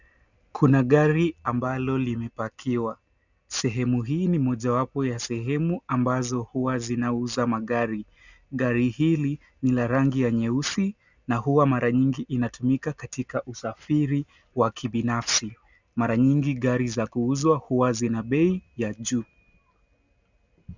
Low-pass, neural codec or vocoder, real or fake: 7.2 kHz; none; real